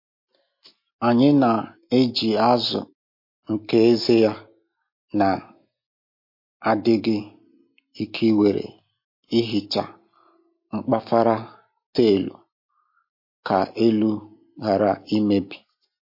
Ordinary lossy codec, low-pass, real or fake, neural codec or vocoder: MP3, 32 kbps; 5.4 kHz; real; none